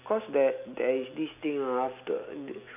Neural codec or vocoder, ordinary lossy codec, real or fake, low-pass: none; none; real; 3.6 kHz